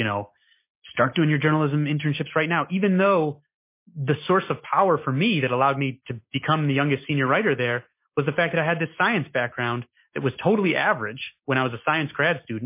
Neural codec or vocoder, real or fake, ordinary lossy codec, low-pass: none; real; MP3, 24 kbps; 3.6 kHz